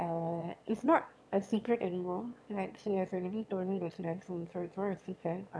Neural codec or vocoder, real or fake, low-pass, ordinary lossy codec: autoencoder, 22.05 kHz, a latent of 192 numbers a frame, VITS, trained on one speaker; fake; none; none